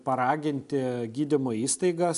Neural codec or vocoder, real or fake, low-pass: none; real; 10.8 kHz